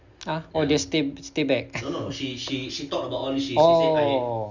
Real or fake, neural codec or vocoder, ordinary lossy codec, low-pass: real; none; none; 7.2 kHz